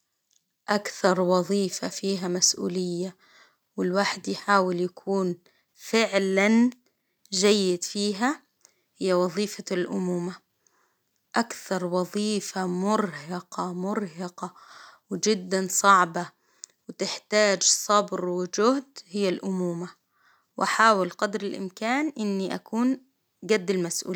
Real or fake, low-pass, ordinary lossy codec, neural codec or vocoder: real; none; none; none